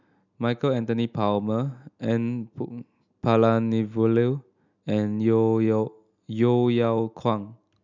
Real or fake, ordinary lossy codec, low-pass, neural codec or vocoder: real; none; 7.2 kHz; none